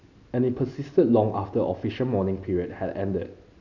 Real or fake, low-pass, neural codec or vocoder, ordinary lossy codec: real; 7.2 kHz; none; none